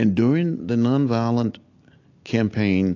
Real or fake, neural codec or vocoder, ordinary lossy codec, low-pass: real; none; AAC, 48 kbps; 7.2 kHz